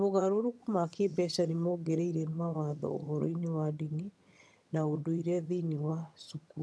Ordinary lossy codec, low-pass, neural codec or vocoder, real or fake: none; none; vocoder, 22.05 kHz, 80 mel bands, HiFi-GAN; fake